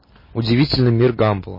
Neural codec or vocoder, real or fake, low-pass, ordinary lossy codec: none; real; 5.4 kHz; MP3, 24 kbps